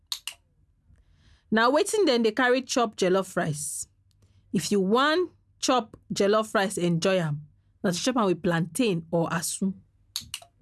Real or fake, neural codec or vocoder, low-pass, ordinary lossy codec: real; none; none; none